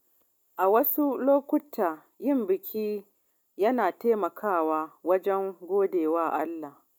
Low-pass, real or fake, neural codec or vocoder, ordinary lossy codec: 19.8 kHz; real; none; none